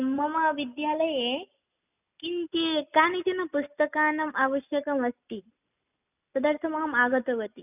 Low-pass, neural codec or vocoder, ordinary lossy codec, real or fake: 3.6 kHz; none; none; real